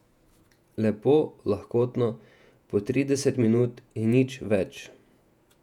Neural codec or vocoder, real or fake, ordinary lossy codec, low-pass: vocoder, 48 kHz, 128 mel bands, Vocos; fake; none; 19.8 kHz